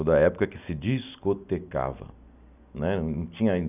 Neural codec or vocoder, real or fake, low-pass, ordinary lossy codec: none; real; 3.6 kHz; none